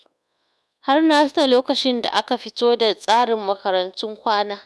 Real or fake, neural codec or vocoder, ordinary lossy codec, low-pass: fake; codec, 24 kHz, 1.2 kbps, DualCodec; none; none